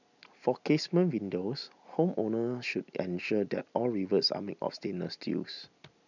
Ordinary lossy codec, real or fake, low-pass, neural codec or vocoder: none; real; 7.2 kHz; none